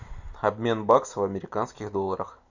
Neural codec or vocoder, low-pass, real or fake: none; 7.2 kHz; real